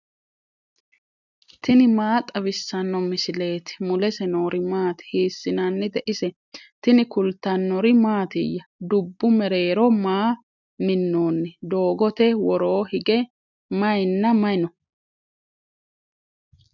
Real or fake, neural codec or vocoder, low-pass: real; none; 7.2 kHz